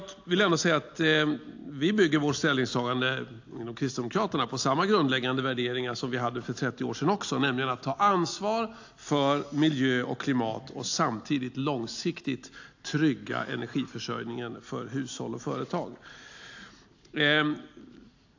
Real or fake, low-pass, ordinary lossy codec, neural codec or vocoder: real; 7.2 kHz; AAC, 48 kbps; none